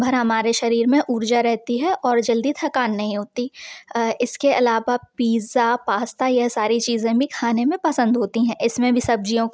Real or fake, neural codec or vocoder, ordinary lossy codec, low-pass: real; none; none; none